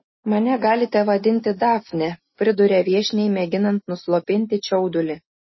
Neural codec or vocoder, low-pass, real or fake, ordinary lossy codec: none; 7.2 kHz; real; MP3, 24 kbps